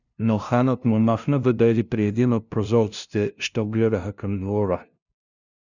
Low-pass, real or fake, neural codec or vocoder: 7.2 kHz; fake; codec, 16 kHz, 0.5 kbps, FunCodec, trained on LibriTTS, 25 frames a second